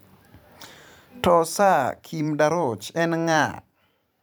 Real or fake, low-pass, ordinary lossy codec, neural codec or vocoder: fake; none; none; vocoder, 44.1 kHz, 128 mel bands every 512 samples, BigVGAN v2